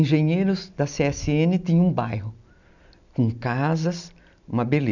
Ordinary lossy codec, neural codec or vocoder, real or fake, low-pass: none; none; real; 7.2 kHz